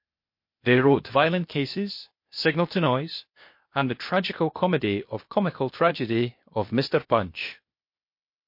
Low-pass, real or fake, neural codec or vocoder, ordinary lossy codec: 5.4 kHz; fake; codec, 16 kHz, 0.8 kbps, ZipCodec; MP3, 32 kbps